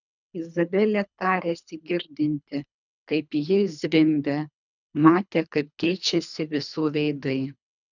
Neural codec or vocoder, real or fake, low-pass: codec, 24 kHz, 3 kbps, HILCodec; fake; 7.2 kHz